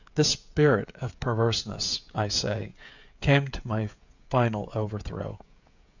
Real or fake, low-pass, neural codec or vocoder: fake; 7.2 kHz; codec, 16 kHz, 16 kbps, FreqCodec, smaller model